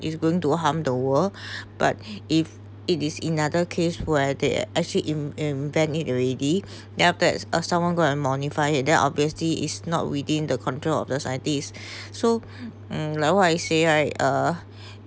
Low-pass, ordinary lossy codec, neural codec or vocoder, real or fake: none; none; none; real